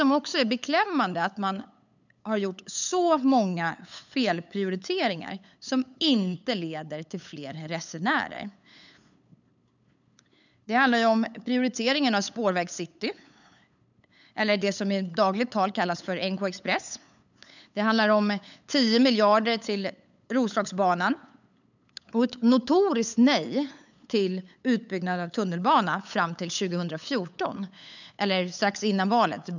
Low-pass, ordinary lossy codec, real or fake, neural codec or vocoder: 7.2 kHz; none; fake; codec, 16 kHz, 8 kbps, FunCodec, trained on LibriTTS, 25 frames a second